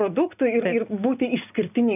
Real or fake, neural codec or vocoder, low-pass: real; none; 3.6 kHz